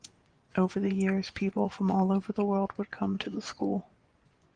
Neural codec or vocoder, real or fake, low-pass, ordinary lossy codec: vocoder, 24 kHz, 100 mel bands, Vocos; fake; 9.9 kHz; Opus, 24 kbps